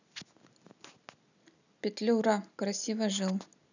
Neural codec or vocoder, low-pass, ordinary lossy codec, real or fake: none; 7.2 kHz; none; real